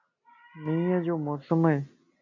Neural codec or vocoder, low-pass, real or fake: none; 7.2 kHz; real